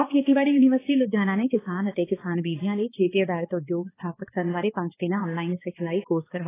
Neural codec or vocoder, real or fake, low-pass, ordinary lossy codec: codec, 16 kHz, 2 kbps, X-Codec, HuBERT features, trained on balanced general audio; fake; 3.6 kHz; AAC, 16 kbps